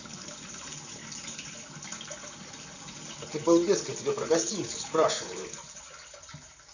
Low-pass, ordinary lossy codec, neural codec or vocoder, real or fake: 7.2 kHz; none; vocoder, 44.1 kHz, 128 mel bands, Pupu-Vocoder; fake